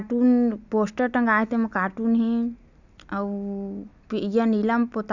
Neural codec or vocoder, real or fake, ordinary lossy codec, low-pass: none; real; none; 7.2 kHz